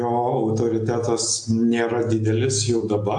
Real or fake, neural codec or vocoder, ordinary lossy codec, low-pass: real; none; AAC, 64 kbps; 10.8 kHz